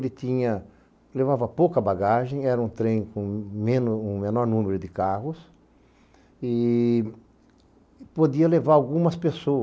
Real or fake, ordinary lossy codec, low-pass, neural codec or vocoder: real; none; none; none